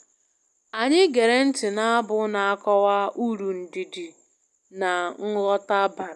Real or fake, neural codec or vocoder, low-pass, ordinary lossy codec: real; none; none; none